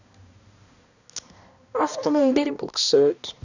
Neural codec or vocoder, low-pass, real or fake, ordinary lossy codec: codec, 16 kHz, 1 kbps, X-Codec, HuBERT features, trained on balanced general audio; 7.2 kHz; fake; none